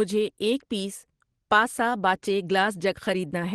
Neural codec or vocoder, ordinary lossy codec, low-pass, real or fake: none; Opus, 16 kbps; 10.8 kHz; real